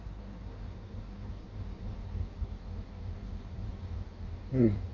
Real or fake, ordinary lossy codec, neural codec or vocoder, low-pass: fake; MP3, 64 kbps; codec, 16 kHz in and 24 kHz out, 1.1 kbps, FireRedTTS-2 codec; 7.2 kHz